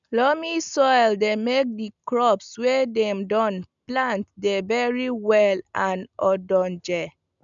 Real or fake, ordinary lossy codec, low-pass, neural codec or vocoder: real; none; 7.2 kHz; none